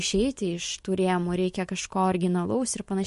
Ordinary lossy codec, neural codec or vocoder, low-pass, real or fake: MP3, 48 kbps; none; 14.4 kHz; real